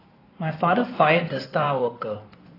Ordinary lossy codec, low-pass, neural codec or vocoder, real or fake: AAC, 24 kbps; 5.4 kHz; codec, 16 kHz in and 24 kHz out, 2.2 kbps, FireRedTTS-2 codec; fake